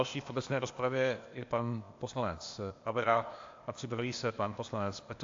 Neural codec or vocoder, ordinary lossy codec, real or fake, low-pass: codec, 16 kHz, 0.8 kbps, ZipCodec; AAC, 48 kbps; fake; 7.2 kHz